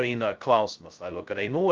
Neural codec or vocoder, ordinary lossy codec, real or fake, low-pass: codec, 16 kHz, 0.2 kbps, FocalCodec; Opus, 32 kbps; fake; 7.2 kHz